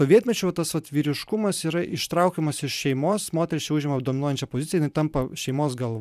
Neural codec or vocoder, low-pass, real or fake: vocoder, 44.1 kHz, 128 mel bands every 512 samples, BigVGAN v2; 14.4 kHz; fake